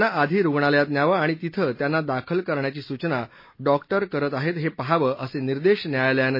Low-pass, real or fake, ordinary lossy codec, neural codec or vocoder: 5.4 kHz; real; MP3, 24 kbps; none